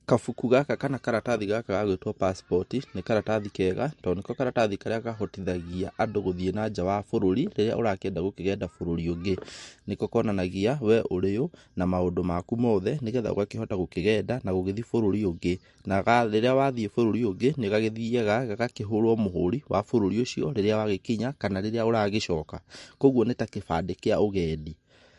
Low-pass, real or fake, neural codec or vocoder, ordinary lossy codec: 14.4 kHz; real; none; MP3, 48 kbps